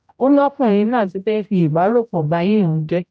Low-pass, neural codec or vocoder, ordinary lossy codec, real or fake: none; codec, 16 kHz, 0.5 kbps, X-Codec, HuBERT features, trained on general audio; none; fake